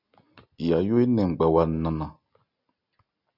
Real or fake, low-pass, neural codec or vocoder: real; 5.4 kHz; none